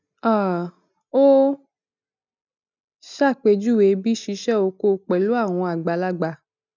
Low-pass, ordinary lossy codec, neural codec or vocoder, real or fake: 7.2 kHz; none; none; real